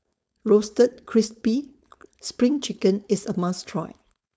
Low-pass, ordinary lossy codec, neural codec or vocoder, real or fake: none; none; codec, 16 kHz, 4.8 kbps, FACodec; fake